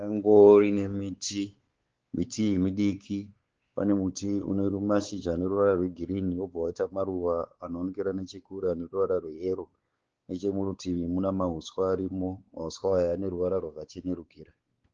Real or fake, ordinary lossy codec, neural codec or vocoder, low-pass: fake; Opus, 16 kbps; codec, 16 kHz, 4 kbps, X-Codec, WavLM features, trained on Multilingual LibriSpeech; 7.2 kHz